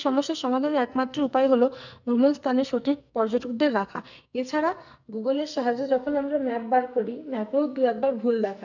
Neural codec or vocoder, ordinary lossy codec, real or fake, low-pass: codec, 44.1 kHz, 2.6 kbps, SNAC; none; fake; 7.2 kHz